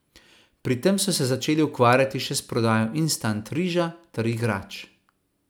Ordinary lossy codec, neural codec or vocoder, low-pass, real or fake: none; none; none; real